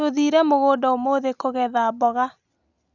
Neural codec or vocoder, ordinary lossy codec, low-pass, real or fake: none; none; 7.2 kHz; real